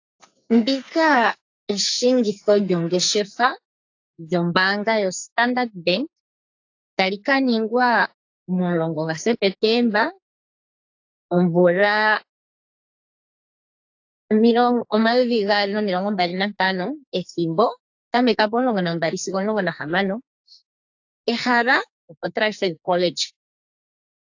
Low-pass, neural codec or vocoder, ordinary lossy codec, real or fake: 7.2 kHz; codec, 44.1 kHz, 2.6 kbps, SNAC; AAC, 48 kbps; fake